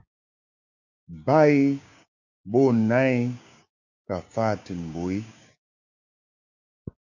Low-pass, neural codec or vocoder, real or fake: 7.2 kHz; codec, 16 kHz, 6 kbps, DAC; fake